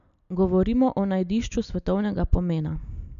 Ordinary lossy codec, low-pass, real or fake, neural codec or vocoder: none; 7.2 kHz; real; none